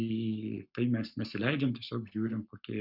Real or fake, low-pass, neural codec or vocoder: fake; 5.4 kHz; codec, 44.1 kHz, 7.8 kbps, Pupu-Codec